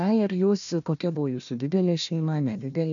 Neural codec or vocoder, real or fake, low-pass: codec, 16 kHz, 1 kbps, FunCodec, trained on Chinese and English, 50 frames a second; fake; 7.2 kHz